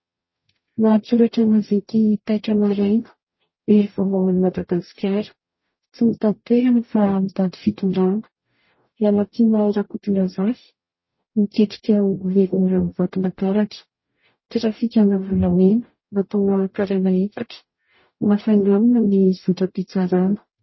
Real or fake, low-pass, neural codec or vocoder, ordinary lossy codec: fake; 7.2 kHz; codec, 44.1 kHz, 0.9 kbps, DAC; MP3, 24 kbps